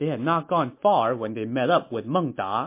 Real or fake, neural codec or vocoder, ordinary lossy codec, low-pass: real; none; MP3, 24 kbps; 3.6 kHz